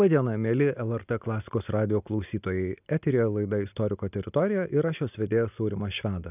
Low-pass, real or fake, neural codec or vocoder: 3.6 kHz; real; none